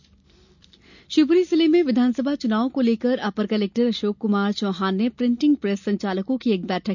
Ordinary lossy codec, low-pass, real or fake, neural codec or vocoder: none; 7.2 kHz; real; none